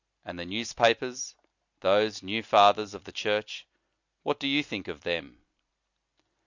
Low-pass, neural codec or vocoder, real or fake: 7.2 kHz; none; real